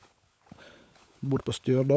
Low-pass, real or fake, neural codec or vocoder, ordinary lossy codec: none; fake; codec, 16 kHz, 16 kbps, FunCodec, trained on LibriTTS, 50 frames a second; none